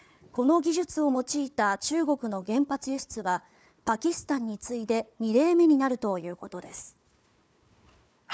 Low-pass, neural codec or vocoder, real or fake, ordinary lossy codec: none; codec, 16 kHz, 4 kbps, FunCodec, trained on Chinese and English, 50 frames a second; fake; none